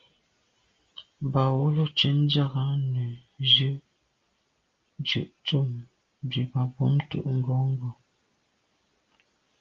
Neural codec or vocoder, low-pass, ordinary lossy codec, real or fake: none; 7.2 kHz; Opus, 32 kbps; real